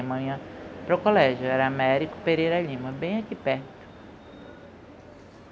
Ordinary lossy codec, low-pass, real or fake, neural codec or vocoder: none; none; real; none